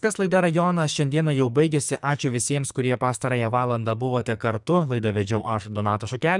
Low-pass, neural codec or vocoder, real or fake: 10.8 kHz; codec, 44.1 kHz, 2.6 kbps, SNAC; fake